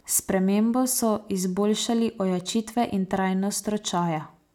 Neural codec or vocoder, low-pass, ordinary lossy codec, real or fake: none; 19.8 kHz; none; real